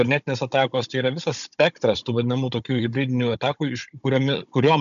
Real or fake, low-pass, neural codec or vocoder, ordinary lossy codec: fake; 7.2 kHz; codec, 16 kHz, 16 kbps, FunCodec, trained on Chinese and English, 50 frames a second; MP3, 96 kbps